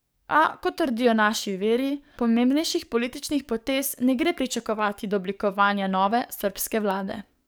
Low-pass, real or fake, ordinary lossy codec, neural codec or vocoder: none; fake; none; codec, 44.1 kHz, 7.8 kbps, DAC